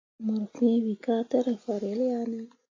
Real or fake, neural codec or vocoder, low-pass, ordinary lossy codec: real; none; 7.2 kHz; AAC, 32 kbps